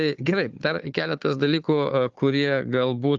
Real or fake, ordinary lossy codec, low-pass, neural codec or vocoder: fake; Opus, 32 kbps; 7.2 kHz; codec, 16 kHz, 4 kbps, FunCodec, trained on Chinese and English, 50 frames a second